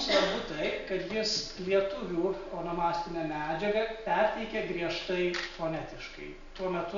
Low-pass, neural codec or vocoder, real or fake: 7.2 kHz; none; real